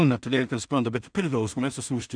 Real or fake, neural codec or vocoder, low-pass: fake; codec, 16 kHz in and 24 kHz out, 0.4 kbps, LongCat-Audio-Codec, two codebook decoder; 9.9 kHz